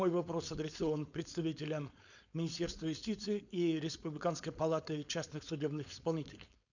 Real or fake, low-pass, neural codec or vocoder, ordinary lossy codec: fake; 7.2 kHz; codec, 16 kHz, 4.8 kbps, FACodec; none